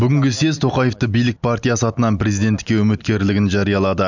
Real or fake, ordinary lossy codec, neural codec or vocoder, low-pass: real; none; none; 7.2 kHz